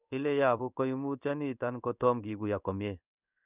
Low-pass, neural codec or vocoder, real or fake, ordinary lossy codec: 3.6 kHz; codec, 16 kHz in and 24 kHz out, 1 kbps, XY-Tokenizer; fake; none